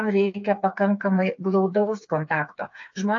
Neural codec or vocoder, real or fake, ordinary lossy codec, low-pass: codec, 16 kHz, 4 kbps, FreqCodec, smaller model; fake; AAC, 48 kbps; 7.2 kHz